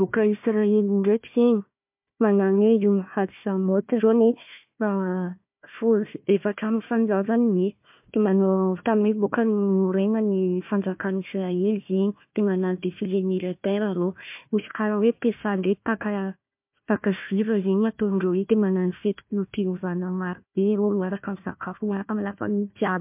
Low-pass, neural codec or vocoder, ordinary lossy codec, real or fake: 3.6 kHz; codec, 16 kHz, 1 kbps, FunCodec, trained on Chinese and English, 50 frames a second; MP3, 32 kbps; fake